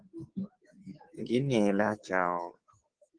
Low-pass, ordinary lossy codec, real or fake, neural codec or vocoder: 9.9 kHz; Opus, 16 kbps; fake; codec, 24 kHz, 3.1 kbps, DualCodec